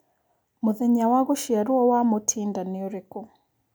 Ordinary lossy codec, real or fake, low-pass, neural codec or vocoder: none; real; none; none